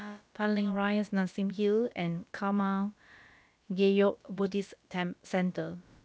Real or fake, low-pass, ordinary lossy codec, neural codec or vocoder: fake; none; none; codec, 16 kHz, about 1 kbps, DyCAST, with the encoder's durations